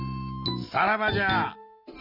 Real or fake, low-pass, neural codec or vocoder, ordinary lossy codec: real; 5.4 kHz; none; none